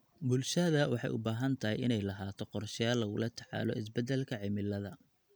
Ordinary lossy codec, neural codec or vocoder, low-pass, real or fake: none; none; none; real